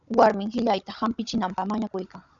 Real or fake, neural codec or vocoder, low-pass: fake; codec, 16 kHz, 16 kbps, FunCodec, trained on Chinese and English, 50 frames a second; 7.2 kHz